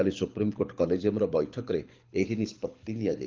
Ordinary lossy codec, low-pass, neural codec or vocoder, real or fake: Opus, 24 kbps; 7.2 kHz; codec, 24 kHz, 6 kbps, HILCodec; fake